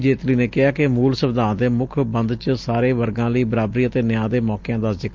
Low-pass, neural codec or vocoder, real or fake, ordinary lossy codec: 7.2 kHz; none; real; Opus, 24 kbps